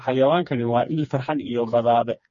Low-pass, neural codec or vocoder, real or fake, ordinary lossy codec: 7.2 kHz; codec, 16 kHz, 2 kbps, FreqCodec, smaller model; fake; MP3, 32 kbps